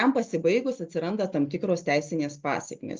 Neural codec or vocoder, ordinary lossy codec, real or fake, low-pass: none; Opus, 24 kbps; real; 7.2 kHz